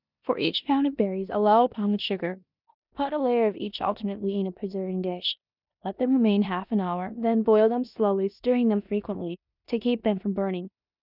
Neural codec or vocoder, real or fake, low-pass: codec, 16 kHz in and 24 kHz out, 0.9 kbps, LongCat-Audio-Codec, four codebook decoder; fake; 5.4 kHz